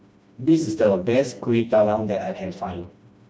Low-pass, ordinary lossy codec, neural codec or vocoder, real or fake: none; none; codec, 16 kHz, 1 kbps, FreqCodec, smaller model; fake